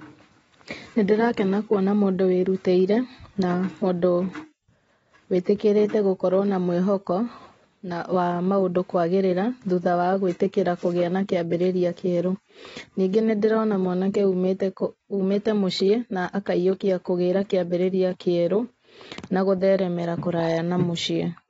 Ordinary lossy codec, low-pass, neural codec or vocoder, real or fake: AAC, 24 kbps; 19.8 kHz; none; real